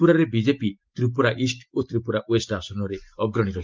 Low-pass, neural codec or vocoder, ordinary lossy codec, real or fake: 7.2 kHz; none; Opus, 24 kbps; real